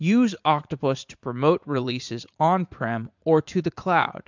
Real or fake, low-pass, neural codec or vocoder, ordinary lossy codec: fake; 7.2 kHz; vocoder, 44.1 kHz, 80 mel bands, Vocos; MP3, 64 kbps